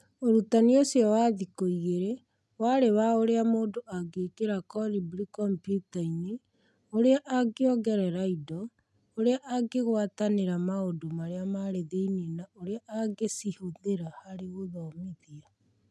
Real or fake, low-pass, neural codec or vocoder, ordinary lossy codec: real; none; none; none